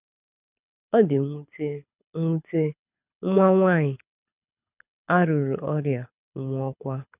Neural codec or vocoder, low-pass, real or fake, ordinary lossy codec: codec, 24 kHz, 6 kbps, HILCodec; 3.6 kHz; fake; none